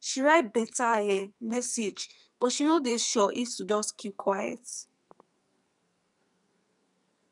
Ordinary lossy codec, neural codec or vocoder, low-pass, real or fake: none; codec, 44.1 kHz, 2.6 kbps, SNAC; 10.8 kHz; fake